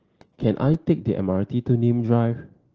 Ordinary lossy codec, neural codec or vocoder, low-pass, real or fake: Opus, 16 kbps; none; 7.2 kHz; real